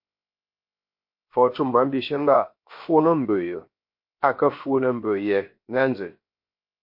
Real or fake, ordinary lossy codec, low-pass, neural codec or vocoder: fake; MP3, 32 kbps; 5.4 kHz; codec, 16 kHz, 0.7 kbps, FocalCodec